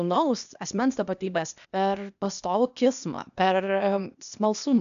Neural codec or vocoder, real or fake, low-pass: codec, 16 kHz, 0.8 kbps, ZipCodec; fake; 7.2 kHz